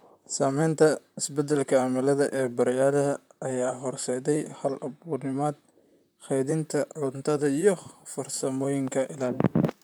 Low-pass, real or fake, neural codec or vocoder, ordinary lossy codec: none; fake; vocoder, 44.1 kHz, 128 mel bands, Pupu-Vocoder; none